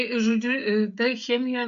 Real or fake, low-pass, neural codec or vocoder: fake; 7.2 kHz; codec, 16 kHz, 16 kbps, FreqCodec, smaller model